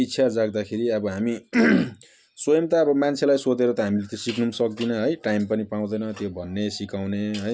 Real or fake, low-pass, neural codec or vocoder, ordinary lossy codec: real; none; none; none